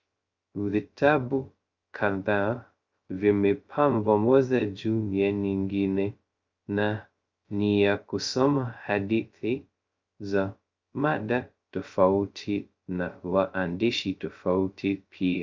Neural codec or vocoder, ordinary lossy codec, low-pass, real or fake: codec, 16 kHz, 0.2 kbps, FocalCodec; Opus, 24 kbps; 7.2 kHz; fake